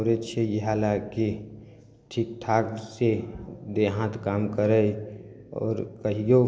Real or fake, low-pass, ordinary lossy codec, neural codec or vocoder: real; none; none; none